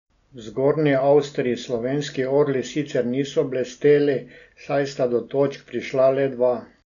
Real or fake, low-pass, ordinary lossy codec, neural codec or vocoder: real; 7.2 kHz; none; none